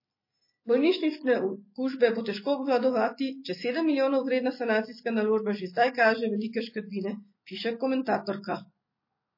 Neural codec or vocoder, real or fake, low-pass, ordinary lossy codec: none; real; 5.4 kHz; MP3, 24 kbps